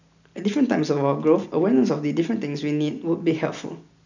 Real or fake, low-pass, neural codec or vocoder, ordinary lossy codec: real; 7.2 kHz; none; none